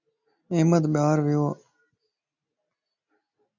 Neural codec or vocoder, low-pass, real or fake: none; 7.2 kHz; real